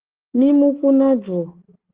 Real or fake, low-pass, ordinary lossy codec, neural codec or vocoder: real; 3.6 kHz; Opus, 16 kbps; none